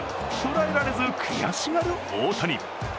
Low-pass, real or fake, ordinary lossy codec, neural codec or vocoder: none; real; none; none